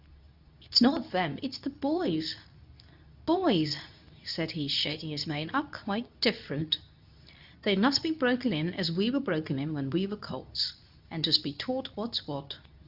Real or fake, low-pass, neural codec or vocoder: fake; 5.4 kHz; codec, 24 kHz, 0.9 kbps, WavTokenizer, medium speech release version 2